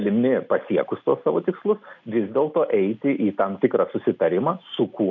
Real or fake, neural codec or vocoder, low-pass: real; none; 7.2 kHz